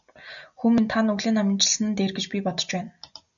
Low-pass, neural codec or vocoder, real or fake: 7.2 kHz; none; real